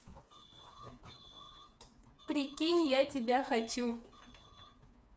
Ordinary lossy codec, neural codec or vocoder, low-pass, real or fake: none; codec, 16 kHz, 4 kbps, FreqCodec, smaller model; none; fake